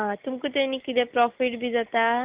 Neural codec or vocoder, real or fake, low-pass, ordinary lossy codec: none; real; 3.6 kHz; Opus, 32 kbps